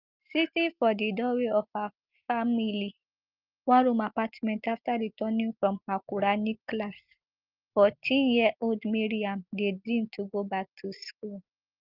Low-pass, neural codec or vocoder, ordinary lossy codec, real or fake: 5.4 kHz; none; Opus, 24 kbps; real